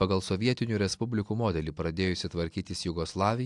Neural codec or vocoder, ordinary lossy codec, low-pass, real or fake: none; AAC, 64 kbps; 10.8 kHz; real